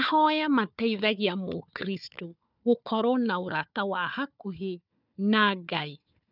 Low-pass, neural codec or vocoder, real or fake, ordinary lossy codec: 5.4 kHz; codec, 16 kHz, 4 kbps, FunCodec, trained on Chinese and English, 50 frames a second; fake; none